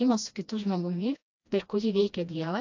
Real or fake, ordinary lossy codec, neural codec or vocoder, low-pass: fake; AAC, 48 kbps; codec, 16 kHz, 1 kbps, FreqCodec, smaller model; 7.2 kHz